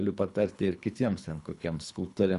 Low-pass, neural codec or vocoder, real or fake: 10.8 kHz; codec, 24 kHz, 3 kbps, HILCodec; fake